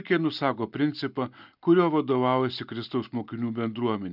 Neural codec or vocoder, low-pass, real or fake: none; 5.4 kHz; real